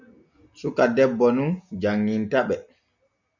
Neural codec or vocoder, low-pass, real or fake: none; 7.2 kHz; real